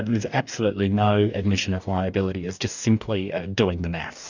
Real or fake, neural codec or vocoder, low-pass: fake; codec, 44.1 kHz, 2.6 kbps, DAC; 7.2 kHz